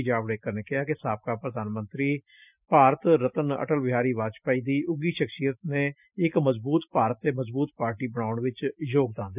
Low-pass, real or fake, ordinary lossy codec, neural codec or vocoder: 3.6 kHz; real; none; none